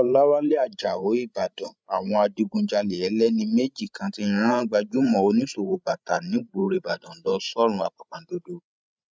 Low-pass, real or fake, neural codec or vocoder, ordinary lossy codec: none; fake; codec, 16 kHz, 16 kbps, FreqCodec, larger model; none